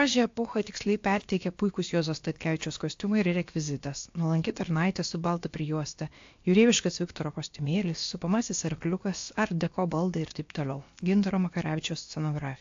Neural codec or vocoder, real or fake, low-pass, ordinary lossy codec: codec, 16 kHz, about 1 kbps, DyCAST, with the encoder's durations; fake; 7.2 kHz; AAC, 48 kbps